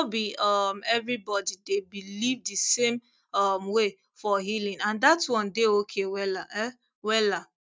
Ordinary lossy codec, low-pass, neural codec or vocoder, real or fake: none; none; none; real